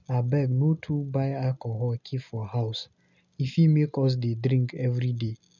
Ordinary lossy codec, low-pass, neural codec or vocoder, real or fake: none; 7.2 kHz; none; real